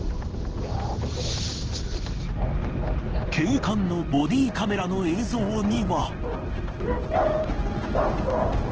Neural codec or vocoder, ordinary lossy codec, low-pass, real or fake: codec, 16 kHz in and 24 kHz out, 1 kbps, XY-Tokenizer; Opus, 16 kbps; 7.2 kHz; fake